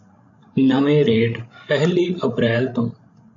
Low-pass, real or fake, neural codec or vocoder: 7.2 kHz; fake; codec, 16 kHz, 16 kbps, FreqCodec, larger model